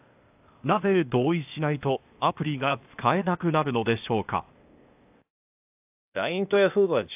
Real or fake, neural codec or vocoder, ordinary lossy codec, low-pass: fake; codec, 16 kHz, 0.8 kbps, ZipCodec; AAC, 32 kbps; 3.6 kHz